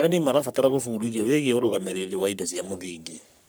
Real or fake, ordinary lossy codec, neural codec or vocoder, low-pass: fake; none; codec, 44.1 kHz, 3.4 kbps, Pupu-Codec; none